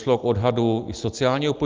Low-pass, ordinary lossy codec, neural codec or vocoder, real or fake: 7.2 kHz; Opus, 32 kbps; none; real